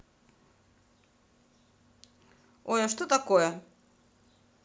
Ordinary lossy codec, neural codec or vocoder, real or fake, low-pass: none; none; real; none